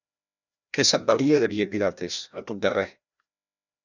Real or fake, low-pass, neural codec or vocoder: fake; 7.2 kHz; codec, 16 kHz, 1 kbps, FreqCodec, larger model